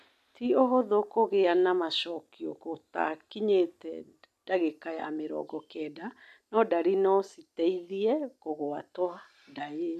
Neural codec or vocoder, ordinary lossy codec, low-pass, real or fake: none; none; 14.4 kHz; real